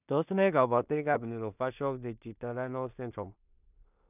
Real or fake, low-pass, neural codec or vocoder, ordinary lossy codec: fake; 3.6 kHz; codec, 16 kHz in and 24 kHz out, 0.4 kbps, LongCat-Audio-Codec, two codebook decoder; none